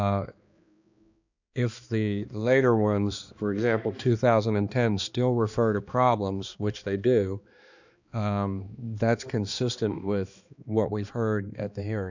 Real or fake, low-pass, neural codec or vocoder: fake; 7.2 kHz; codec, 16 kHz, 2 kbps, X-Codec, HuBERT features, trained on balanced general audio